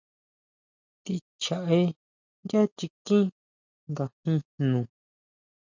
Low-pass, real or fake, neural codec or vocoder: 7.2 kHz; real; none